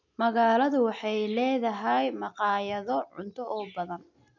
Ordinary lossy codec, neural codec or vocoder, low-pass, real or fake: none; none; 7.2 kHz; real